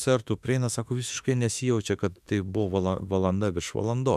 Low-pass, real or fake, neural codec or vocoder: 14.4 kHz; fake; autoencoder, 48 kHz, 32 numbers a frame, DAC-VAE, trained on Japanese speech